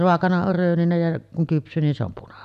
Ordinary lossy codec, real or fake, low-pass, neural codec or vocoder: none; real; 14.4 kHz; none